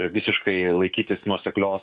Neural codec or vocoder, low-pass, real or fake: codec, 44.1 kHz, 7.8 kbps, DAC; 10.8 kHz; fake